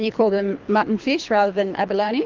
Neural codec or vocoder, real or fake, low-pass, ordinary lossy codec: codec, 24 kHz, 3 kbps, HILCodec; fake; 7.2 kHz; Opus, 24 kbps